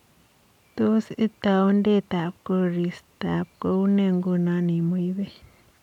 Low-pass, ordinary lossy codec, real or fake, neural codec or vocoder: 19.8 kHz; none; real; none